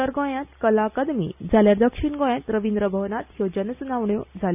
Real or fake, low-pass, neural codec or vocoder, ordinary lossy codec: real; 3.6 kHz; none; none